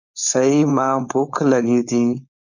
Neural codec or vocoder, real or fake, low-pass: codec, 16 kHz, 4.8 kbps, FACodec; fake; 7.2 kHz